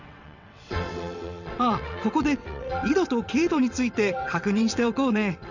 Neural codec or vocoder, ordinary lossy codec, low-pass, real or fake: vocoder, 22.05 kHz, 80 mel bands, WaveNeXt; none; 7.2 kHz; fake